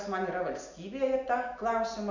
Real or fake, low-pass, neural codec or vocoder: real; 7.2 kHz; none